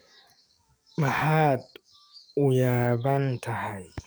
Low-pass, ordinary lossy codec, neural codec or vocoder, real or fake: none; none; codec, 44.1 kHz, 7.8 kbps, DAC; fake